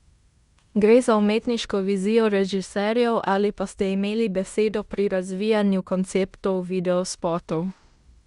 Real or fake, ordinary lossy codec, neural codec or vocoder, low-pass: fake; Opus, 64 kbps; codec, 16 kHz in and 24 kHz out, 0.9 kbps, LongCat-Audio-Codec, fine tuned four codebook decoder; 10.8 kHz